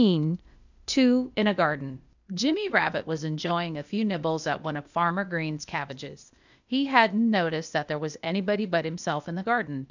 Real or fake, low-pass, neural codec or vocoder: fake; 7.2 kHz; codec, 16 kHz, 0.8 kbps, ZipCodec